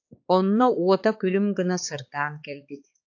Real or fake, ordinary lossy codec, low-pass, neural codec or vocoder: fake; AAC, 48 kbps; 7.2 kHz; codec, 16 kHz, 4 kbps, X-Codec, HuBERT features, trained on balanced general audio